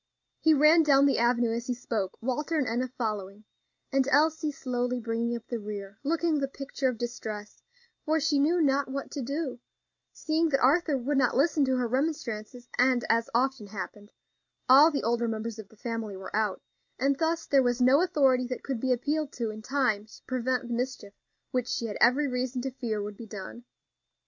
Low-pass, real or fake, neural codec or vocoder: 7.2 kHz; real; none